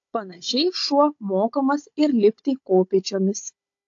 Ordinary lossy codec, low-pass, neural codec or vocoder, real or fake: AAC, 48 kbps; 7.2 kHz; codec, 16 kHz, 16 kbps, FunCodec, trained on Chinese and English, 50 frames a second; fake